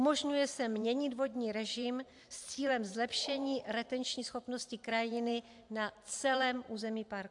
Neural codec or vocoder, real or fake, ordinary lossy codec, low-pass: vocoder, 44.1 kHz, 128 mel bands every 512 samples, BigVGAN v2; fake; AAC, 64 kbps; 10.8 kHz